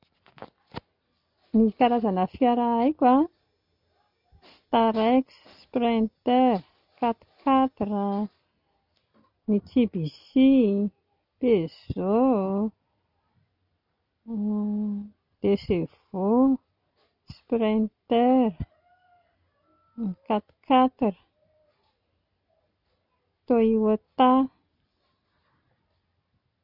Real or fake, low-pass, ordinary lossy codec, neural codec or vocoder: real; 5.4 kHz; none; none